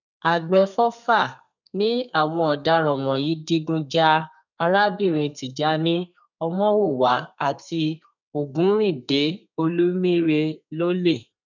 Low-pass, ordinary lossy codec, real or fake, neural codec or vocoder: 7.2 kHz; none; fake; codec, 32 kHz, 1.9 kbps, SNAC